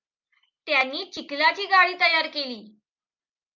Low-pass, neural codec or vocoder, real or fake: 7.2 kHz; none; real